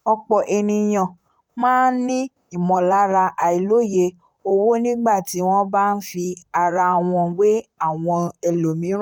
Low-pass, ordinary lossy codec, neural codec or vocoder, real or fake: 19.8 kHz; none; vocoder, 44.1 kHz, 128 mel bands, Pupu-Vocoder; fake